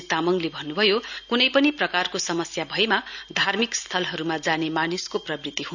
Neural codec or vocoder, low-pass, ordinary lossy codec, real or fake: none; 7.2 kHz; none; real